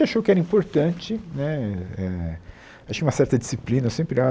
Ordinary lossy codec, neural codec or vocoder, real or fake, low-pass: none; none; real; none